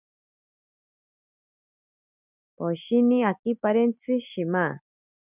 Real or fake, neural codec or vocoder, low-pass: real; none; 3.6 kHz